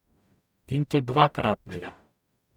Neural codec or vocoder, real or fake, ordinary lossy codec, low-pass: codec, 44.1 kHz, 0.9 kbps, DAC; fake; none; 19.8 kHz